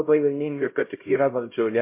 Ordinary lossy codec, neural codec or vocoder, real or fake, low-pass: MP3, 24 kbps; codec, 16 kHz, 0.5 kbps, X-Codec, HuBERT features, trained on LibriSpeech; fake; 3.6 kHz